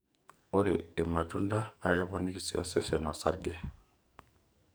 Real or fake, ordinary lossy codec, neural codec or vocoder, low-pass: fake; none; codec, 44.1 kHz, 2.6 kbps, SNAC; none